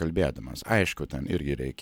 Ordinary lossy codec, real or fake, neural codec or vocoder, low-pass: MP3, 96 kbps; real; none; 19.8 kHz